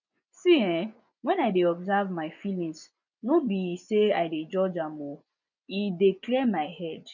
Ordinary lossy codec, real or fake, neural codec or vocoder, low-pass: none; fake; vocoder, 24 kHz, 100 mel bands, Vocos; 7.2 kHz